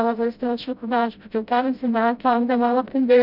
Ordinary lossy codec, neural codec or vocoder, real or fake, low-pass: none; codec, 16 kHz, 0.5 kbps, FreqCodec, smaller model; fake; 5.4 kHz